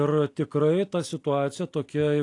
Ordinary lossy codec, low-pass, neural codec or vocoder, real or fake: AAC, 48 kbps; 10.8 kHz; none; real